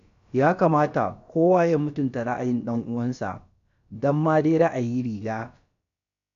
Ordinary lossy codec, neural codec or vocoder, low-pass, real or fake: none; codec, 16 kHz, about 1 kbps, DyCAST, with the encoder's durations; 7.2 kHz; fake